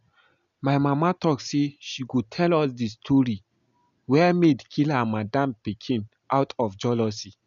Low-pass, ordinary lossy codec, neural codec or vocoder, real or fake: 7.2 kHz; none; none; real